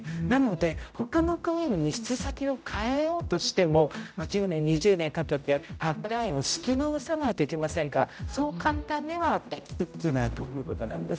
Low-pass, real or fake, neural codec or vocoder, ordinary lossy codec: none; fake; codec, 16 kHz, 0.5 kbps, X-Codec, HuBERT features, trained on general audio; none